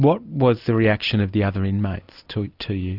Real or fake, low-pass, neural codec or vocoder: real; 5.4 kHz; none